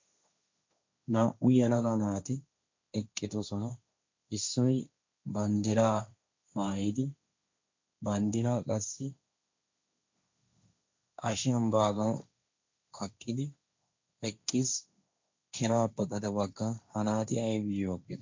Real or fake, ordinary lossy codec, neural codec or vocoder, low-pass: fake; MP3, 64 kbps; codec, 16 kHz, 1.1 kbps, Voila-Tokenizer; 7.2 kHz